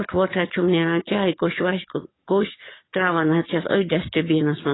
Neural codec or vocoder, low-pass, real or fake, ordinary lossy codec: codec, 44.1 kHz, 7.8 kbps, DAC; 7.2 kHz; fake; AAC, 16 kbps